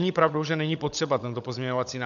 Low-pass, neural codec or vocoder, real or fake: 7.2 kHz; codec, 16 kHz, 16 kbps, FunCodec, trained on LibriTTS, 50 frames a second; fake